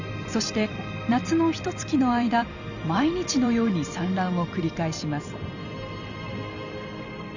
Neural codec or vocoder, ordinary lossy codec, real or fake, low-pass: vocoder, 44.1 kHz, 128 mel bands every 256 samples, BigVGAN v2; none; fake; 7.2 kHz